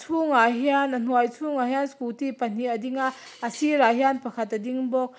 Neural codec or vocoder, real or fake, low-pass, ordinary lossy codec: none; real; none; none